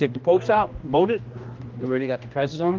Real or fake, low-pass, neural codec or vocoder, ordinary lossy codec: fake; 7.2 kHz; codec, 16 kHz, 1 kbps, X-Codec, HuBERT features, trained on general audio; Opus, 24 kbps